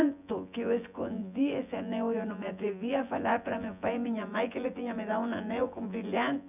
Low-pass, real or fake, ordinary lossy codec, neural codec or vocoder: 3.6 kHz; fake; none; vocoder, 24 kHz, 100 mel bands, Vocos